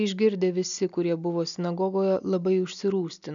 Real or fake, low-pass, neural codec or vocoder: real; 7.2 kHz; none